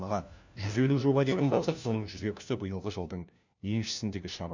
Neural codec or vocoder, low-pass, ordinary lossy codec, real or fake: codec, 16 kHz, 1 kbps, FunCodec, trained on LibriTTS, 50 frames a second; 7.2 kHz; none; fake